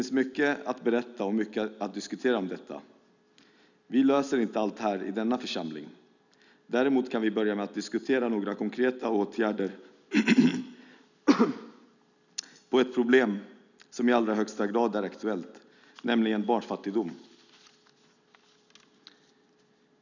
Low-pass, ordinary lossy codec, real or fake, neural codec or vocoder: 7.2 kHz; none; real; none